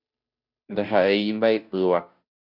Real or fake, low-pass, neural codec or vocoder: fake; 5.4 kHz; codec, 16 kHz, 0.5 kbps, FunCodec, trained on Chinese and English, 25 frames a second